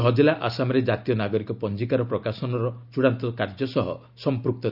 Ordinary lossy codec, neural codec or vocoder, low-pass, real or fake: none; none; 5.4 kHz; real